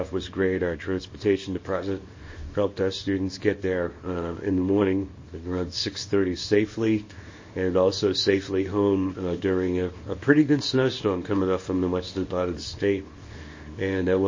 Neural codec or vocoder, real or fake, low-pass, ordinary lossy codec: codec, 24 kHz, 0.9 kbps, WavTokenizer, small release; fake; 7.2 kHz; MP3, 32 kbps